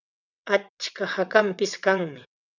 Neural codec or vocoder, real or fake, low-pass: vocoder, 22.05 kHz, 80 mel bands, WaveNeXt; fake; 7.2 kHz